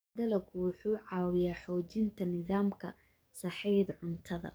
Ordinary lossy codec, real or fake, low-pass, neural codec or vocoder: none; fake; none; codec, 44.1 kHz, 7.8 kbps, DAC